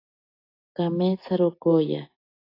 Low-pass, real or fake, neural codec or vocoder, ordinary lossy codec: 5.4 kHz; real; none; AAC, 24 kbps